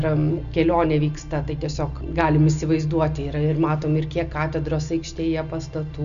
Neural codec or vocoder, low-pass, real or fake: none; 7.2 kHz; real